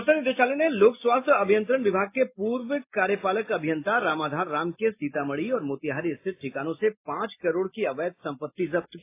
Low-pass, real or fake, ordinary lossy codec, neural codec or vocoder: 3.6 kHz; real; AAC, 24 kbps; none